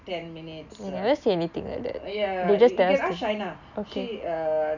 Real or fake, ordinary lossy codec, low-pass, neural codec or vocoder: real; none; 7.2 kHz; none